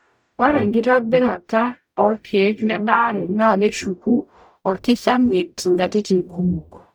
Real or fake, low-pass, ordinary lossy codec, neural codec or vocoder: fake; none; none; codec, 44.1 kHz, 0.9 kbps, DAC